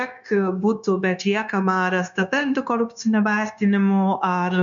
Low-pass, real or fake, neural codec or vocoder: 7.2 kHz; fake; codec, 16 kHz, 0.9 kbps, LongCat-Audio-Codec